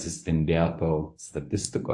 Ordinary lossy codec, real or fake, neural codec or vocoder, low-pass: AAC, 64 kbps; fake; codec, 24 kHz, 0.9 kbps, WavTokenizer, medium speech release version 1; 10.8 kHz